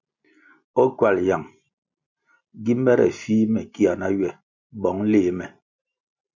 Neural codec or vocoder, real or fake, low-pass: none; real; 7.2 kHz